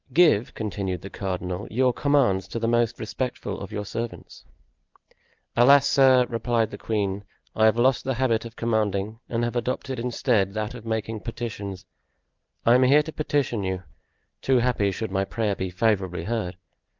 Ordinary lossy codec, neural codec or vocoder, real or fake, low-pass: Opus, 24 kbps; none; real; 7.2 kHz